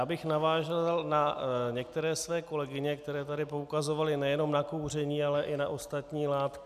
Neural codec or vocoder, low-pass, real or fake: none; 14.4 kHz; real